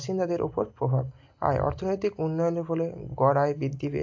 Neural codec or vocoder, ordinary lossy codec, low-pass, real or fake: none; AAC, 48 kbps; 7.2 kHz; real